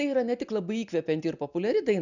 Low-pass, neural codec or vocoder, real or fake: 7.2 kHz; none; real